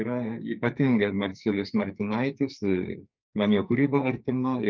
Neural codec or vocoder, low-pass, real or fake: codec, 44.1 kHz, 2.6 kbps, SNAC; 7.2 kHz; fake